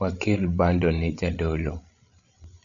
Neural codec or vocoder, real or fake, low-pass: codec, 16 kHz, 16 kbps, FreqCodec, larger model; fake; 7.2 kHz